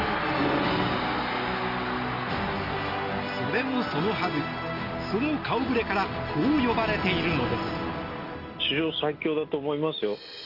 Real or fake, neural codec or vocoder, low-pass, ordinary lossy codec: real; none; 5.4 kHz; Opus, 64 kbps